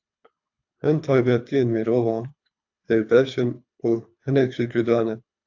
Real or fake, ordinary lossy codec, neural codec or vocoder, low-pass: fake; AAC, 48 kbps; codec, 24 kHz, 3 kbps, HILCodec; 7.2 kHz